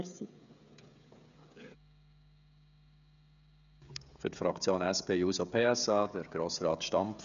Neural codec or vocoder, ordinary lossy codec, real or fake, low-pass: codec, 16 kHz, 16 kbps, FreqCodec, smaller model; none; fake; 7.2 kHz